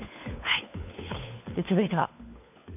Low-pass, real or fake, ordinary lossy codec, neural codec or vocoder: 3.6 kHz; fake; none; codec, 16 kHz, 4 kbps, FunCodec, trained on LibriTTS, 50 frames a second